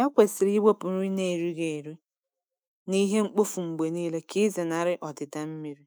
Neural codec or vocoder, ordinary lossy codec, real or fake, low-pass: autoencoder, 48 kHz, 128 numbers a frame, DAC-VAE, trained on Japanese speech; none; fake; none